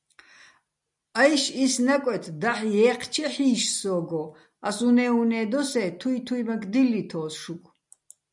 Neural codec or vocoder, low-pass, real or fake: none; 10.8 kHz; real